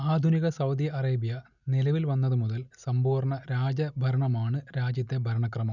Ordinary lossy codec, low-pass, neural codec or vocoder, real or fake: none; 7.2 kHz; none; real